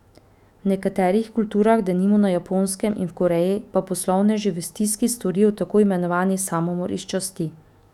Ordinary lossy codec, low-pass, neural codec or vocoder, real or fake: none; 19.8 kHz; autoencoder, 48 kHz, 128 numbers a frame, DAC-VAE, trained on Japanese speech; fake